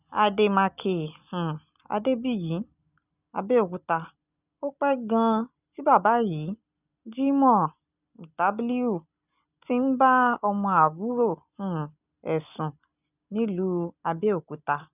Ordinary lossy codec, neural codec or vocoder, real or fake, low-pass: none; none; real; 3.6 kHz